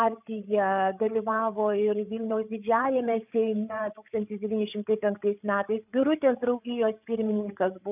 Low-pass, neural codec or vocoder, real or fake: 3.6 kHz; codec, 16 kHz, 8 kbps, FreqCodec, larger model; fake